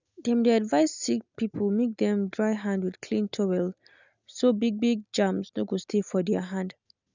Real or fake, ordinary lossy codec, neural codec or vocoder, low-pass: real; none; none; 7.2 kHz